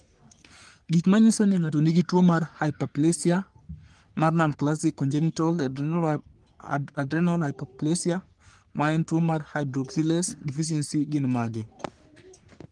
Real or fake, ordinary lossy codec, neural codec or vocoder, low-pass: fake; Opus, 24 kbps; codec, 44.1 kHz, 3.4 kbps, Pupu-Codec; 10.8 kHz